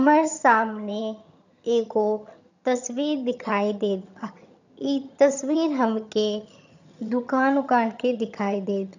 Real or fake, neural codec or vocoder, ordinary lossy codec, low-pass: fake; vocoder, 22.05 kHz, 80 mel bands, HiFi-GAN; none; 7.2 kHz